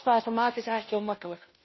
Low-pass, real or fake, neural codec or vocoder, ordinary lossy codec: 7.2 kHz; fake; codec, 16 kHz, 0.5 kbps, FunCodec, trained on Chinese and English, 25 frames a second; MP3, 24 kbps